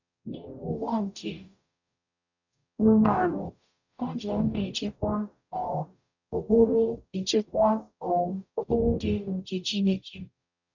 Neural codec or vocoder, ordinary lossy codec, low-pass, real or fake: codec, 44.1 kHz, 0.9 kbps, DAC; none; 7.2 kHz; fake